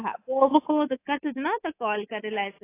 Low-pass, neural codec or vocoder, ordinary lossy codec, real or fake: 3.6 kHz; codec, 24 kHz, 3.1 kbps, DualCodec; AAC, 24 kbps; fake